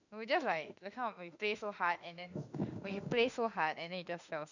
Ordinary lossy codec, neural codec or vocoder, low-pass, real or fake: none; autoencoder, 48 kHz, 32 numbers a frame, DAC-VAE, trained on Japanese speech; 7.2 kHz; fake